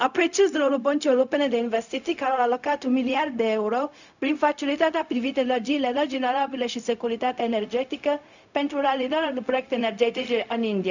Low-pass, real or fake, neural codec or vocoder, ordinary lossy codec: 7.2 kHz; fake; codec, 16 kHz, 0.4 kbps, LongCat-Audio-Codec; none